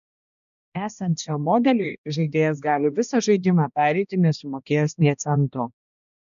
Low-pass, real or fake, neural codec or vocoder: 7.2 kHz; fake; codec, 16 kHz, 1 kbps, X-Codec, HuBERT features, trained on balanced general audio